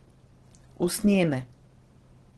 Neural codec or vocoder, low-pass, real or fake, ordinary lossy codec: none; 10.8 kHz; real; Opus, 16 kbps